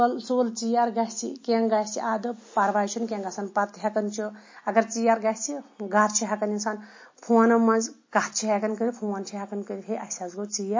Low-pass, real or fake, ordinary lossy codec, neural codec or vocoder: 7.2 kHz; real; MP3, 32 kbps; none